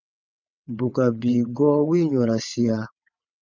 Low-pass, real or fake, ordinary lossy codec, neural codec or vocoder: 7.2 kHz; fake; MP3, 64 kbps; vocoder, 22.05 kHz, 80 mel bands, WaveNeXt